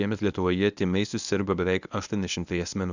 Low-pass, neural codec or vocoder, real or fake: 7.2 kHz; codec, 24 kHz, 0.9 kbps, WavTokenizer, medium speech release version 1; fake